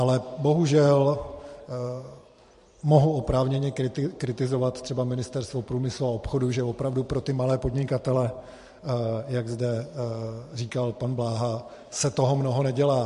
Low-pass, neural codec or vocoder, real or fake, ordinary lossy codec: 14.4 kHz; vocoder, 44.1 kHz, 128 mel bands every 512 samples, BigVGAN v2; fake; MP3, 48 kbps